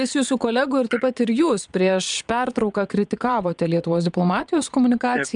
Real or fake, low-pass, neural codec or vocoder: fake; 9.9 kHz; vocoder, 22.05 kHz, 80 mel bands, Vocos